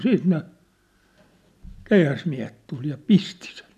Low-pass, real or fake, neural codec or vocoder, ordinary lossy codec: 14.4 kHz; real; none; none